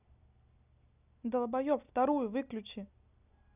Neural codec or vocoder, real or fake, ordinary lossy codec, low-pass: none; real; none; 3.6 kHz